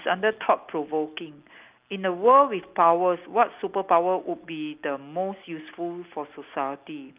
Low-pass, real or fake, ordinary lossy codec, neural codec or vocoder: 3.6 kHz; real; Opus, 24 kbps; none